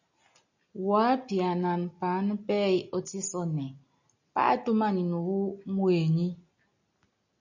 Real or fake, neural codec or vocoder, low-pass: real; none; 7.2 kHz